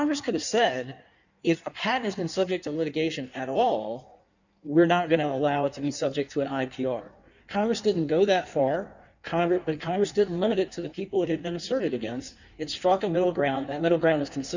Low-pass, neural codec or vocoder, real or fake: 7.2 kHz; codec, 16 kHz in and 24 kHz out, 1.1 kbps, FireRedTTS-2 codec; fake